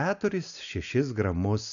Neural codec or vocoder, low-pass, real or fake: none; 7.2 kHz; real